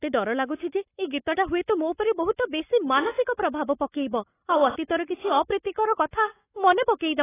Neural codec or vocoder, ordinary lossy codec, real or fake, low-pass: codec, 44.1 kHz, 7.8 kbps, Pupu-Codec; AAC, 16 kbps; fake; 3.6 kHz